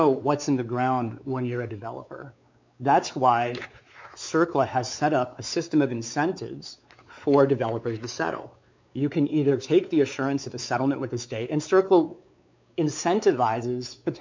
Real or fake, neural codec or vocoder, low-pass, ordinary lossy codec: fake; codec, 16 kHz, 4 kbps, X-Codec, WavLM features, trained on Multilingual LibriSpeech; 7.2 kHz; MP3, 64 kbps